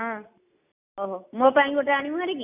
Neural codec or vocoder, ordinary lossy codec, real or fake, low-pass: none; none; real; 3.6 kHz